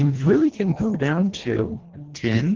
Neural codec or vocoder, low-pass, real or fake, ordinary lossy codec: codec, 24 kHz, 1.5 kbps, HILCodec; 7.2 kHz; fake; Opus, 16 kbps